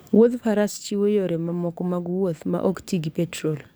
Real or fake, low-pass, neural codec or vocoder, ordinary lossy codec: fake; none; codec, 44.1 kHz, 7.8 kbps, DAC; none